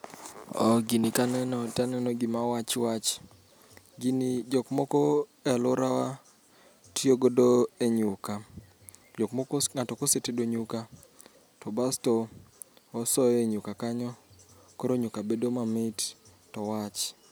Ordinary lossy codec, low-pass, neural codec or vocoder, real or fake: none; none; none; real